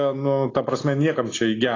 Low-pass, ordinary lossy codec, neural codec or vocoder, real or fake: 7.2 kHz; AAC, 32 kbps; none; real